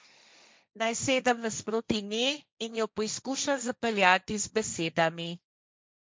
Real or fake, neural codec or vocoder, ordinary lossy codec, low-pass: fake; codec, 16 kHz, 1.1 kbps, Voila-Tokenizer; none; none